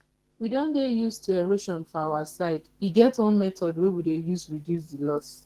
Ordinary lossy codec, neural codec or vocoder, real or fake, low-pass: Opus, 16 kbps; codec, 44.1 kHz, 2.6 kbps, SNAC; fake; 14.4 kHz